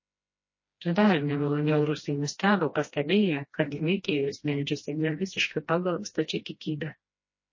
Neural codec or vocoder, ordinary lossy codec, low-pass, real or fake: codec, 16 kHz, 1 kbps, FreqCodec, smaller model; MP3, 32 kbps; 7.2 kHz; fake